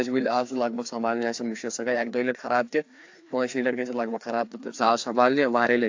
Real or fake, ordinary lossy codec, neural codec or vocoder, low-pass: fake; AAC, 48 kbps; codec, 16 kHz, 2 kbps, FreqCodec, larger model; 7.2 kHz